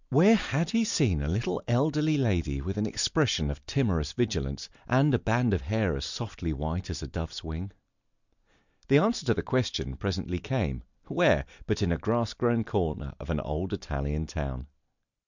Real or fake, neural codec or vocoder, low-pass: real; none; 7.2 kHz